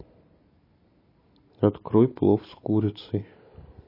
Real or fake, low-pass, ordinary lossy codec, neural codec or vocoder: real; 5.4 kHz; MP3, 24 kbps; none